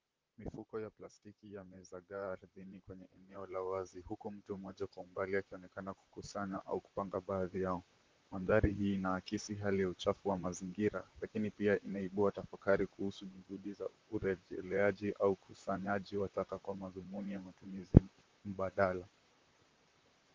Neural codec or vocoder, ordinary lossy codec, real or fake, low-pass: vocoder, 44.1 kHz, 128 mel bands, Pupu-Vocoder; Opus, 32 kbps; fake; 7.2 kHz